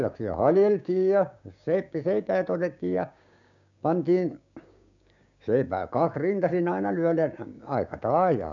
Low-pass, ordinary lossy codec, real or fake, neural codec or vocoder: 7.2 kHz; none; fake; codec, 16 kHz, 6 kbps, DAC